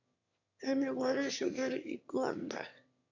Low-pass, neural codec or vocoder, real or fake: 7.2 kHz; autoencoder, 22.05 kHz, a latent of 192 numbers a frame, VITS, trained on one speaker; fake